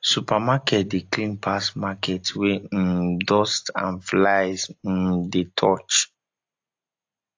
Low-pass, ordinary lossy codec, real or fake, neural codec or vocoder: 7.2 kHz; AAC, 48 kbps; real; none